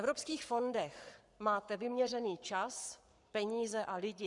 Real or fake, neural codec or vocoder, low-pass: fake; codec, 44.1 kHz, 7.8 kbps, Pupu-Codec; 10.8 kHz